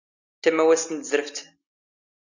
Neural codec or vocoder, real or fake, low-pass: none; real; 7.2 kHz